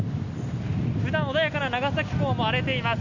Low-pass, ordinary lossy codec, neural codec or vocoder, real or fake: 7.2 kHz; none; none; real